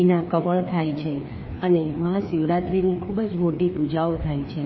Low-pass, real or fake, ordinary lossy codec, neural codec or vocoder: 7.2 kHz; fake; MP3, 24 kbps; codec, 16 kHz, 2 kbps, FreqCodec, larger model